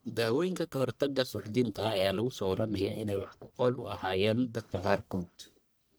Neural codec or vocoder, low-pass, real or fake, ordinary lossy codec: codec, 44.1 kHz, 1.7 kbps, Pupu-Codec; none; fake; none